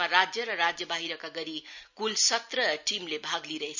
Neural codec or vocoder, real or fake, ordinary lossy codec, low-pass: none; real; none; 7.2 kHz